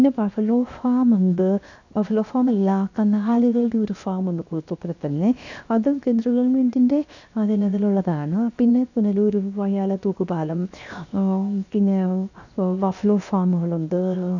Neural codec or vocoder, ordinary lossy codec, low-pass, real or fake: codec, 16 kHz, 0.7 kbps, FocalCodec; none; 7.2 kHz; fake